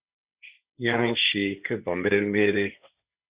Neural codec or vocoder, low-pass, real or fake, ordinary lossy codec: codec, 16 kHz, 1.1 kbps, Voila-Tokenizer; 3.6 kHz; fake; Opus, 64 kbps